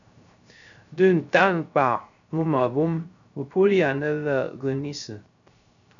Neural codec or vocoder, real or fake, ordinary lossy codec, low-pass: codec, 16 kHz, 0.3 kbps, FocalCodec; fake; MP3, 96 kbps; 7.2 kHz